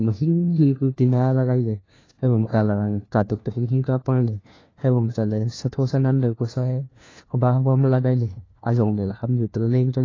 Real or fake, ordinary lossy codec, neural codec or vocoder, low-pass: fake; AAC, 32 kbps; codec, 16 kHz, 1 kbps, FunCodec, trained on LibriTTS, 50 frames a second; 7.2 kHz